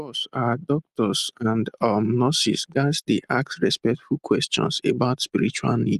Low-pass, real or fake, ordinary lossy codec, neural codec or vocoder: 14.4 kHz; real; Opus, 32 kbps; none